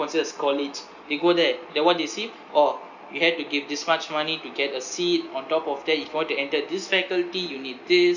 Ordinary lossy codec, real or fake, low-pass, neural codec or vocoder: none; real; 7.2 kHz; none